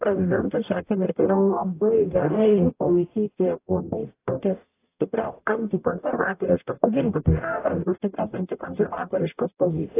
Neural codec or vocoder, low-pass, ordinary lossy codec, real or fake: codec, 44.1 kHz, 0.9 kbps, DAC; 3.6 kHz; AAC, 24 kbps; fake